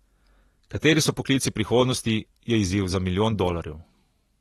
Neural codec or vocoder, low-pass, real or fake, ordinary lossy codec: none; 19.8 kHz; real; AAC, 32 kbps